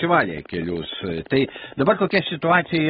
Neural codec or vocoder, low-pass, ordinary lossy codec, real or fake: none; 10.8 kHz; AAC, 16 kbps; real